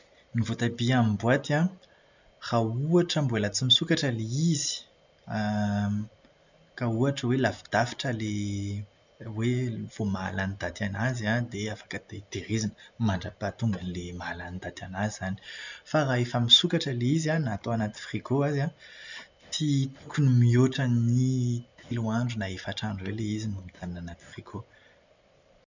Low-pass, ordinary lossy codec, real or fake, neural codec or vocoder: 7.2 kHz; none; real; none